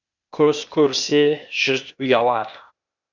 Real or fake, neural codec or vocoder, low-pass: fake; codec, 16 kHz, 0.8 kbps, ZipCodec; 7.2 kHz